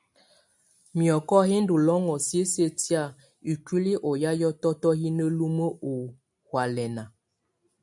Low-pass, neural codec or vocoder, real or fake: 10.8 kHz; none; real